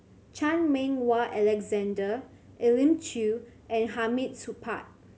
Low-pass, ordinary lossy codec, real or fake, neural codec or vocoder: none; none; real; none